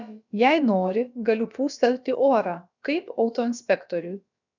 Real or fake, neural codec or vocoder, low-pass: fake; codec, 16 kHz, about 1 kbps, DyCAST, with the encoder's durations; 7.2 kHz